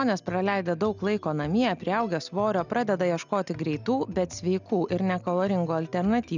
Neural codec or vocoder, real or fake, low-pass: none; real; 7.2 kHz